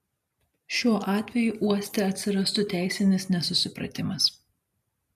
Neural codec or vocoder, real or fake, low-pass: none; real; 14.4 kHz